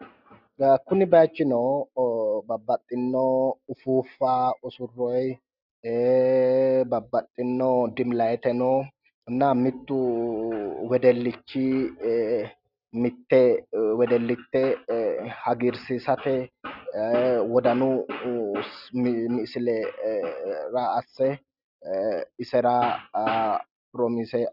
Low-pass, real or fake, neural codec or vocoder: 5.4 kHz; real; none